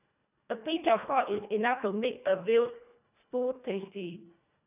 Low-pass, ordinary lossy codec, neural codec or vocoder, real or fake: 3.6 kHz; none; codec, 24 kHz, 1.5 kbps, HILCodec; fake